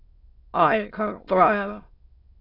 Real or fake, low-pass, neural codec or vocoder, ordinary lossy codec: fake; 5.4 kHz; autoencoder, 22.05 kHz, a latent of 192 numbers a frame, VITS, trained on many speakers; AAC, 24 kbps